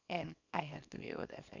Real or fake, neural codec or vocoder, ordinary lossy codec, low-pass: fake; codec, 24 kHz, 0.9 kbps, WavTokenizer, small release; none; 7.2 kHz